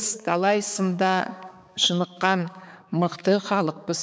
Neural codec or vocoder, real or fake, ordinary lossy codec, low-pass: codec, 16 kHz, 4 kbps, X-Codec, HuBERT features, trained on balanced general audio; fake; none; none